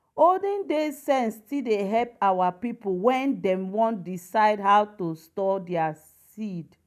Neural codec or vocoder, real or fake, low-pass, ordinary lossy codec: none; real; 14.4 kHz; none